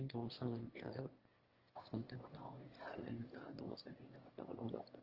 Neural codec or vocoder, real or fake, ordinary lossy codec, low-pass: autoencoder, 22.05 kHz, a latent of 192 numbers a frame, VITS, trained on one speaker; fake; Opus, 16 kbps; 5.4 kHz